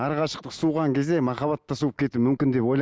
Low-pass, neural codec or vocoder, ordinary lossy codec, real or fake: none; none; none; real